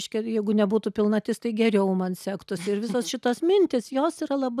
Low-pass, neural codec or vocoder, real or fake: 14.4 kHz; none; real